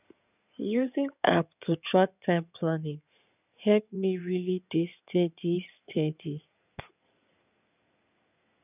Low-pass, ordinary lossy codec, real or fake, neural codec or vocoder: 3.6 kHz; none; fake; codec, 16 kHz in and 24 kHz out, 2.2 kbps, FireRedTTS-2 codec